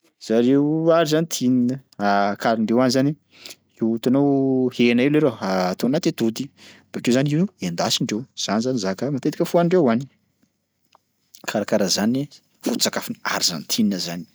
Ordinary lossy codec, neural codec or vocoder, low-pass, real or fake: none; none; none; real